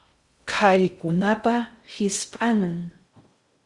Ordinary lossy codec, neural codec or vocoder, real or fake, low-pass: Opus, 64 kbps; codec, 16 kHz in and 24 kHz out, 0.6 kbps, FocalCodec, streaming, 2048 codes; fake; 10.8 kHz